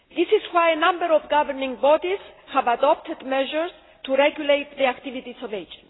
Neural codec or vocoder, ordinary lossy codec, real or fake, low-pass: none; AAC, 16 kbps; real; 7.2 kHz